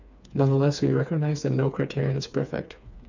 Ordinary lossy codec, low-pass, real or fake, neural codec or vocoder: none; 7.2 kHz; fake; codec, 16 kHz, 4 kbps, FreqCodec, smaller model